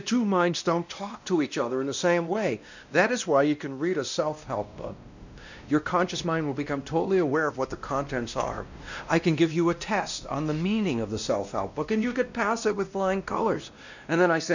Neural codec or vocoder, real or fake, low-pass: codec, 16 kHz, 1 kbps, X-Codec, WavLM features, trained on Multilingual LibriSpeech; fake; 7.2 kHz